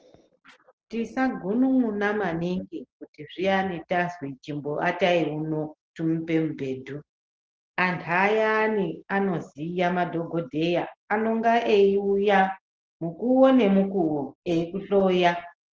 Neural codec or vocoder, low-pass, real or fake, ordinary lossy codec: none; 7.2 kHz; real; Opus, 16 kbps